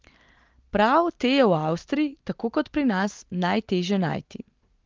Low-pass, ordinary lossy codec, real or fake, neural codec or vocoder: 7.2 kHz; Opus, 32 kbps; real; none